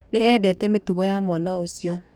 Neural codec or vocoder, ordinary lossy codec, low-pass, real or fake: codec, 44.1 kHz, 2.6 kbps, DAC; none; 19.8 kHz; fake